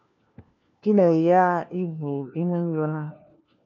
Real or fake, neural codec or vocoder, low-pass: fake; codec, 16 kHz, 1 kbps, FunCodec, trained on LibriTTS, 50 frames a second; 7.2 kHz